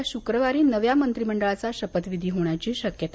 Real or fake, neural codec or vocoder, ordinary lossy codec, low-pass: real; none; none; none